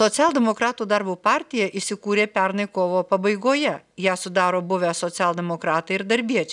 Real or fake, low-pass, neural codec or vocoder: real; 10.8 kHz; none